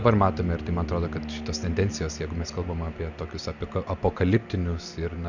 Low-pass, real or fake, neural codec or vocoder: 7.2 kHz; real; none